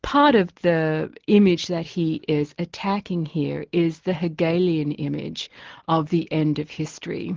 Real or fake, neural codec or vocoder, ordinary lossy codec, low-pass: real; none; Opus, 16 kbps; 7.2 kHz